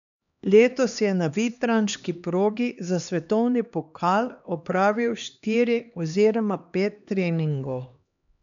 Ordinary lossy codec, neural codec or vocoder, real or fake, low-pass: none; codec, 16 kHz, 2 kbps, X-Codec, HuBERT features, trained on LibriSpeech; fake; 7.2 kHz